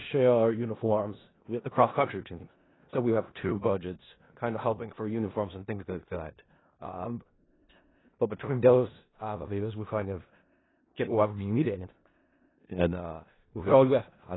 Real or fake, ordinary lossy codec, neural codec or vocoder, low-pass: fake; AAC, 16 kbps; codec, 16 kHz in and 24 kHz out, 0.4 kbps, LongCat-Audio-Codec, four codebook decoder; 7.2 kHz